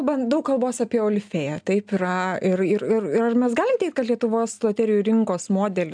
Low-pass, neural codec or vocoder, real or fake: 9.9 kHz; none; real